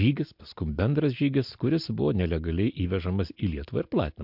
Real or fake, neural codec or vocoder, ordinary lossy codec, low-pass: real; none; MP3, 48 kbps; 5.4 kHz